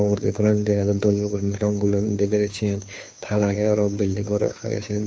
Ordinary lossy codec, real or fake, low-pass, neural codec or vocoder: none; fake; none; codec, 16 kHz, 2 kbps, FunCodec, trained on Chinese and English, 25 frames a second